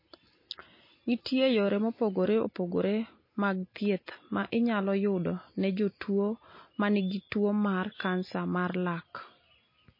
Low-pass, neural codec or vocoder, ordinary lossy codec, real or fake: 5.4 kHz; none; MP3, 24 kbps; real